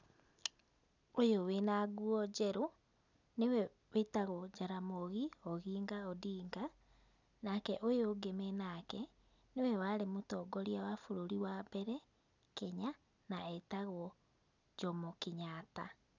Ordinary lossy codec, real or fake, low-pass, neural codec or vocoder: none; real; 7.2 kHz; none